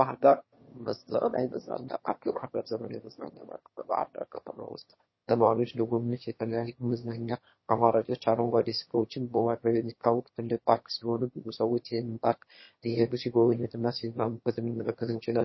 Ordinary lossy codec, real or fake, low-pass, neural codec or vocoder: MP3, 24 kbps; fake; 7.2 kHz; autoencoder, 22.05 kHz, a latent of 192 numbers a frame, VITS, trained on one speaker